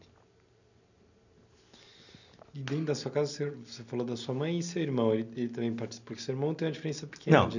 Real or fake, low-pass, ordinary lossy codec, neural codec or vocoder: real; 7.2 kHz; none; none